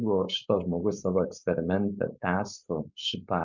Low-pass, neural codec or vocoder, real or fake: 7.2 kHz; codec, 16 kHz, 4.8 kbps, FACodec; fake